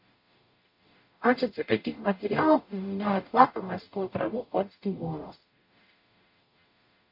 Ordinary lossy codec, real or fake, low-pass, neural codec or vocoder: MP3, 32 kbps; fake; 5.4 kHz; codec, 44.1 kHz, 0.9 kbps, DAC